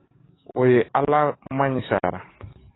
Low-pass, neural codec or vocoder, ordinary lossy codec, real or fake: 7.2 kHz; codec, 44.1 kHz, 7.8 kbps, DAC; AAC, 16 kbps; fake